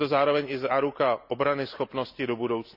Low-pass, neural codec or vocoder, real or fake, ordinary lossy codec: 5.4 kHz; none; real; none